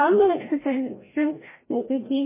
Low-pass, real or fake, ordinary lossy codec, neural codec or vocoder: 3.6 kHz; fake; MP3, 16 kbps; codec, 16 kHz, 0.5 kbps, FreqCodec, larger model